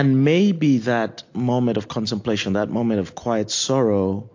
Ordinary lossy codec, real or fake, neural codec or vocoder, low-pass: AAC, 48 kbps; real; none; 7.2 kHz